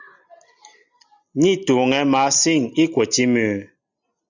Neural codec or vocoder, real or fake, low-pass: none; real; 7.2 kHz